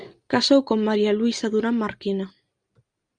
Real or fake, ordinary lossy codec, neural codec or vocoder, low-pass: real; Opus, 64 kbps; none; 9.9 kHz